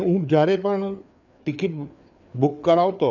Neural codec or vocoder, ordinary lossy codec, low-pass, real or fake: codec, 16 kHz, 4 kbps, FreqCodec, larger model; none; 7.2 kHz; fake